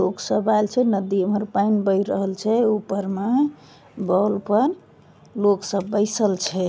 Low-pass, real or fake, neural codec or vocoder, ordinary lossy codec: none; real; none; none